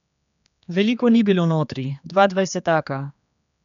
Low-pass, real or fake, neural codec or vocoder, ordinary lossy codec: 7.2 kHz; fake; codec, 16 kHz, 2 kbps, X-Codec, HuBERT features, trained on general audio; none